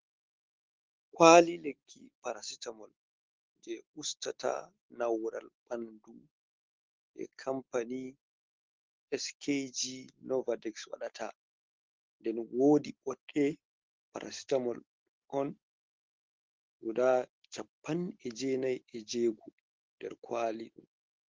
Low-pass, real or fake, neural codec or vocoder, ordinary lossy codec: 7.2 kHz; real; none; Opus, 16 kbps